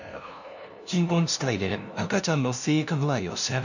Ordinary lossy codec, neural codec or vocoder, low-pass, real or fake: none; codec, 16 kHz, 0.5 kbps, FunCodec, trained on LibriTTS, 25 frames a second; 7.2 kHz; fake